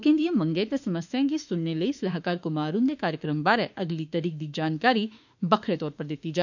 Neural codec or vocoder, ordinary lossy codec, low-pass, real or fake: autoencoder, 48 kHz, 32 numbers a frame, DAC-VAE, trained on Japanese speech; none; 7.2 kHz; fake